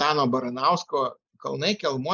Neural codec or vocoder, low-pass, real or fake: none; 7.2 kHz; real